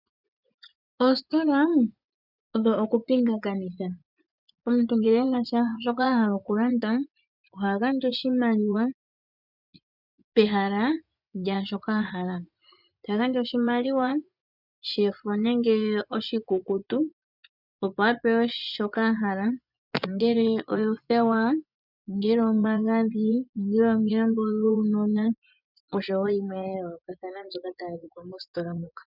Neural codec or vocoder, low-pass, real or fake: vocoder, 24 kHz, 100 mel bands, Vocos; 5.4 kHz; fake